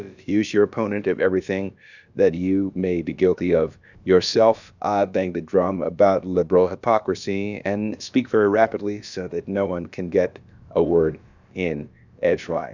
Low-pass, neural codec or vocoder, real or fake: 7.2 kHz; codec, 16 kHz, about 1 kbps, DyCAST, with the encoder's durations; fake